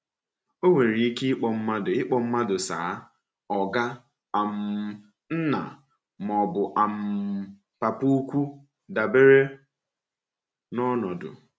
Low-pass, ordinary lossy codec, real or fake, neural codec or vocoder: none; none; real; none